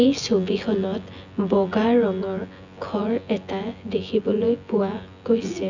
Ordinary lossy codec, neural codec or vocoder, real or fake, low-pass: none; vocoder, 24 kHz, 100 mel bands, Vocos; fake; 7.2 kHz